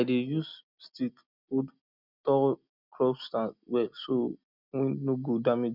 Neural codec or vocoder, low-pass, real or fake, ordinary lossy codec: none; 5.4 kHz; real; none